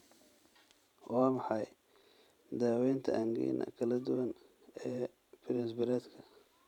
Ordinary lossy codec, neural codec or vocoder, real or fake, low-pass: none; vocoder, 44.1 kHz, 128 mel bands every 512 samples, BigVGAN v2; fake; 19.8 kHz